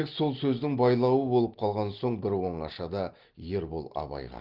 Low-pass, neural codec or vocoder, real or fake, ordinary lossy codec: 5.4 kHz; none; real; Opus, 16 kbps